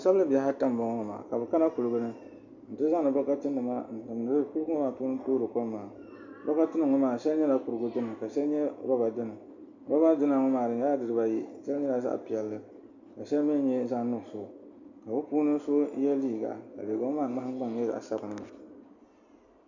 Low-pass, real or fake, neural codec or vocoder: 7.2 kHz; real; none